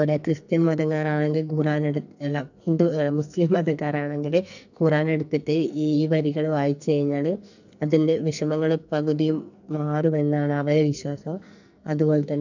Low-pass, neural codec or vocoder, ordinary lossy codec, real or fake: 7.2 kHz; codec, 44.1 kHz, 2.6 kbps, SNAC; none; fake